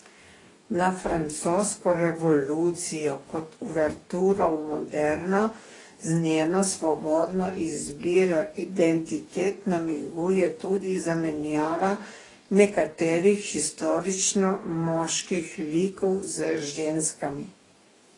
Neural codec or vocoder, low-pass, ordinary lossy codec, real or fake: codec, 44.1 kHz, 2.6 kbps, DAC; 10.8 kHz; AAC, 32 kbps; fake